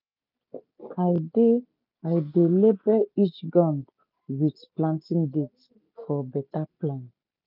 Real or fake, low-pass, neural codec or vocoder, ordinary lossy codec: real; 5.4 kHz; none; none